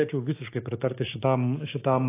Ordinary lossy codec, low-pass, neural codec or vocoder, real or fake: AAC, 24 kbps; 3.6 kHz; codec, 16 kHz, 6 kbps, DAC; fake